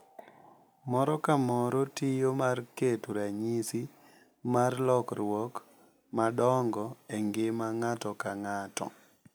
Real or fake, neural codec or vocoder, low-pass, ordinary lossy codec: real; none; none; none